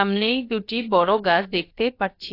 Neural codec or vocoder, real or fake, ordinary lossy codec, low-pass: codec, 16 kHz, about 1 kbps, DyCAST, with the encoder's durations; fake; AAC, 32 kbps; 5.4 kHz